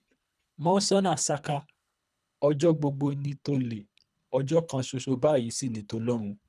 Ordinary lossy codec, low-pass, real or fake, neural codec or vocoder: none; none; fake; codec, 24 kHz, 3 kbps, HILCodec